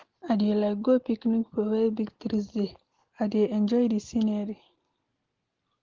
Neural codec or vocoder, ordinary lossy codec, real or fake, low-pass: none; Opus, 16 kbps; real; 7.2 kHz